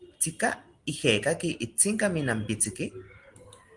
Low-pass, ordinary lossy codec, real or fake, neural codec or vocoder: 10.8 kHz; Opus, 24 kbps; real; none